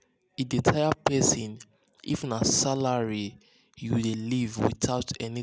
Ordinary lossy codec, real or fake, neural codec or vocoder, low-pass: none; real; none; none